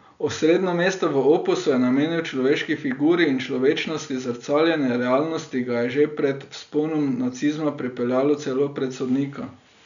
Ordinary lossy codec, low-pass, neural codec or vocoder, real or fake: none; 7.2 kHz; none; real